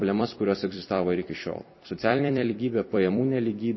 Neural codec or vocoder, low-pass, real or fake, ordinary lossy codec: vocoder, 44.1 kHz, 128 mel bands every 256 samples, BigVGAN v2; 7.2 kHz; fake; MP3, 24 kbps